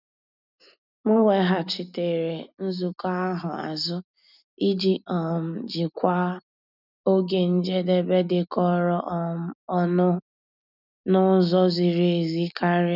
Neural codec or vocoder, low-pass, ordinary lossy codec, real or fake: none; 5.4 kHz; none; real